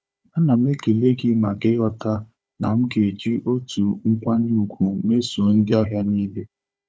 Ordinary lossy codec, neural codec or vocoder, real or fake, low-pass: none; codec, 16 kHz, 4 kbps, FunCodec, trained on Chinese and English, 50 frames a second; fake; none